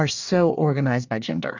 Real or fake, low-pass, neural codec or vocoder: fake; 7.2 kHz; codec, 24 kHz, 1 kbps, SNAC